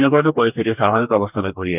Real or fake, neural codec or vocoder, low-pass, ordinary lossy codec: fake; codec, 44.1 kHz, 2.6 kbps, DAC; 3.6 kHz; none